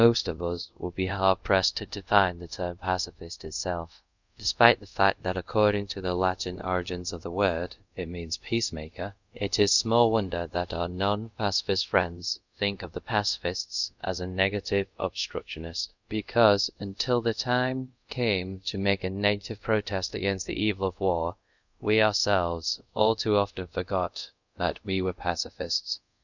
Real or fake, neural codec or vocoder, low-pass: fake; codec, 24 kHz, 0.5 kbps, DualCodec; 7.2 kHz